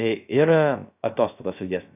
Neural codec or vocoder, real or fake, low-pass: codec, 16 kHz, 0.3 kbps, FocalCodec; fake; 3.6 kHz